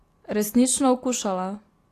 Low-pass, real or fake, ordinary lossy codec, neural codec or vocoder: 14.4 kHz; real; AAC, 48 kbps; none